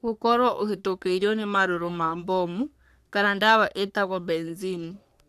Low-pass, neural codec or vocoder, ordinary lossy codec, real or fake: 14.4 kHz; codec, 44.1 kHz, 3.4 kbps, Pupu-Codec; none; fake